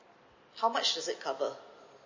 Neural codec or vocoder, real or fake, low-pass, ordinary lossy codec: none; real; 7.2 kHz; MP3, 32 kbps